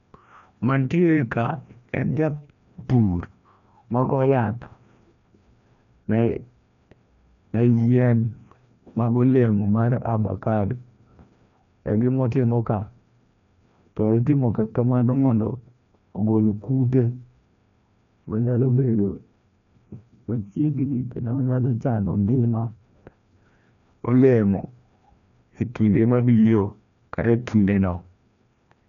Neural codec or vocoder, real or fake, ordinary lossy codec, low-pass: codec, 16 kHz, 1 kbps, FreqCodec, larger model; fake; none; 7.2 kHz